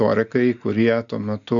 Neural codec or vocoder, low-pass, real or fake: none; 7.2 kHz; real